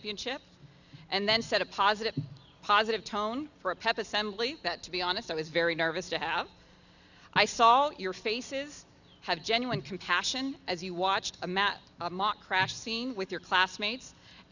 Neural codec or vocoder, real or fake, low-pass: none; real; 7.2 kHz